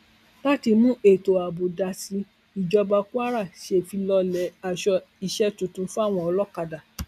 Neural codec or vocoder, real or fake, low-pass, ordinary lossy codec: none; real; 14.4 kHz; none